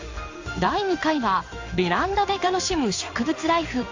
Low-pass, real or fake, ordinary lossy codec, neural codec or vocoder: 7.2 kHz; fake; AAC, 48 kbps; codec, 16 kHz in and 24 kHz out, 1 kbps, XY-Tokenizer